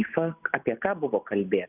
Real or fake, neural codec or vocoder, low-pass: real; none; 3.6 kHz